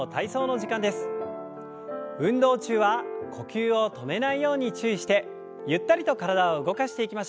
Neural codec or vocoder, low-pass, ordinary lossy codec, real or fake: none; none; none; real